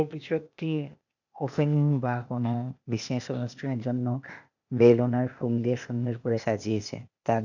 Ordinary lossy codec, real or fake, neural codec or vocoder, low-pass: none; fake; codec, 16 kHz, 0.8 kbps, ZipCodec; 7.2 kHz